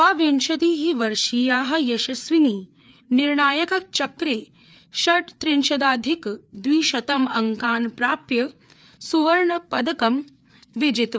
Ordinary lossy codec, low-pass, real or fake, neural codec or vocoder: none; none; fake; codec, 16 kHz, 4 kbps, FreqCodec, larger model